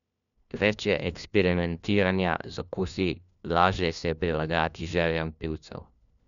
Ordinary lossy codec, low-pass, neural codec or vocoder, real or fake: none; 7.2 kHz; codec, 16 kHz, 1 kbps, FunCodec, trained on LibriTTS, 50 frames a second; fake